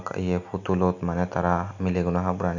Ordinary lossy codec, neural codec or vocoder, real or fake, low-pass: none; none; real; 7.2 kHz